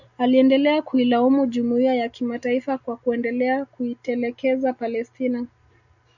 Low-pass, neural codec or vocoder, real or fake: 7.2 kHz; none; real